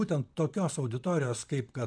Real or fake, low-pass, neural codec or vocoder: fake; 9.9 kHz; vocoder, 44.1 kHz, 128 mel bands, Pupu-Vocoder